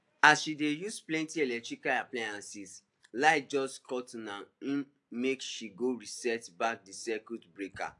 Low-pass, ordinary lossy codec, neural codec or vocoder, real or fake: 10.8 kHz; AAC, 64 kbps; none; real